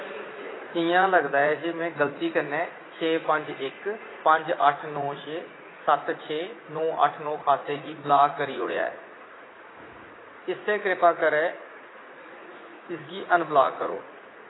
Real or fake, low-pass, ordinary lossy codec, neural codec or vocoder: fake; 7.2 kHz; AAC, 16 kbps; vocoder, 44.1 kHz, 80 mel bands, Vocos